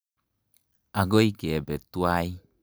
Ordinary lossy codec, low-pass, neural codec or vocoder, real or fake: none; none; none; real